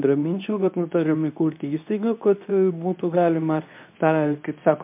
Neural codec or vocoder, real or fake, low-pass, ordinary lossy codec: codec, 24 kHz, 0.9 kbps, WavTokenizer, medium speech release version 2; fake; 3.6 kHz; MP3, 32 kbps